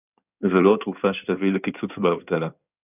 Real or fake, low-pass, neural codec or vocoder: fake; 3.6 kHz; codec, 16 kHz in and 24 kHz out, 2.2 kbps, FireRedTTS-2 codec